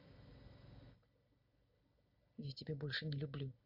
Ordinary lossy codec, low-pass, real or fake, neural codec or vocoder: none; 5.4 kHz; real; none